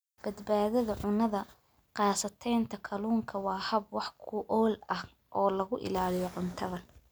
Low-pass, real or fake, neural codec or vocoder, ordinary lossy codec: none; real; none; none